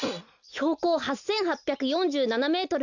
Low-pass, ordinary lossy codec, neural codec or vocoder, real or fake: 7.2 kHz; none; none; real